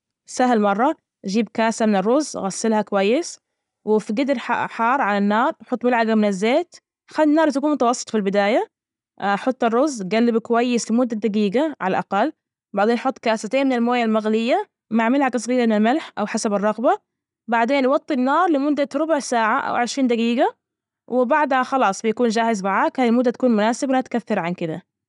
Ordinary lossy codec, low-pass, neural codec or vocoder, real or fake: none; 10.8 kHz; none; real